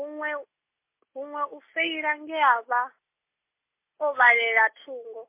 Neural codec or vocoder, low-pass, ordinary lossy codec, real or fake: none; 3.6 kHz; MP3, 24 kbps; real